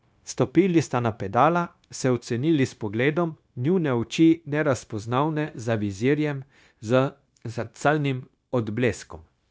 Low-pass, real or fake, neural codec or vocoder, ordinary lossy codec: none; fake; codec, 16 kHz, 0.9 kbps, LongCat-Audio-Codec; none